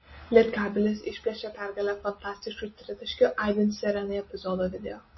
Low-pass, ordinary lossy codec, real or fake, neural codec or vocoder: 7.2 kHz; MP3, 24 kbps; real; none